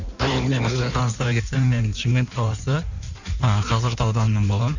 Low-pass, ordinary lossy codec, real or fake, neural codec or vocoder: 7.2 kHz; none; fake; codec, 16 kHz in and 24 kHz out, 1.1 kbps, FireRedTTS-2 codec